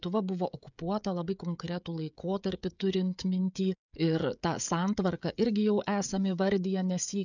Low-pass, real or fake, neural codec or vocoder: 7.2 kHz; fake; codec, 16 kHz, 16 kbps, FreqCodec, smaller model